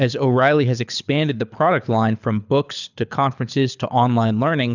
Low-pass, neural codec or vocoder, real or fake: 7.2 kHz; codec, 24 kHz, 6 kbps, HILCodec; fake